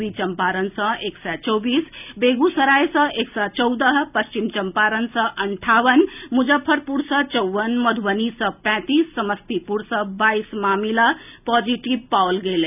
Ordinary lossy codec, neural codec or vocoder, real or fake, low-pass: none; none; real; 3.6 kHz